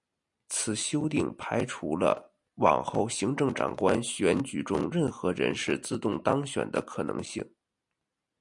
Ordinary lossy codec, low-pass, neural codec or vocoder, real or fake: MP3, 96 kbps; 10.8 kHz; none; real